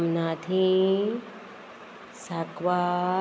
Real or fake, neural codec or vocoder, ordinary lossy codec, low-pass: real; none; none; none